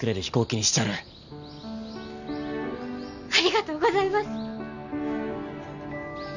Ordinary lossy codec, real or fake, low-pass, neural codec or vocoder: none; real; 7.2 kHz; none